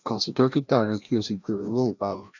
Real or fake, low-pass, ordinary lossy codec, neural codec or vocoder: fake; 7.2 kHz; none; codec, 16 kHz, 1 kbps, FreqCodec, larger model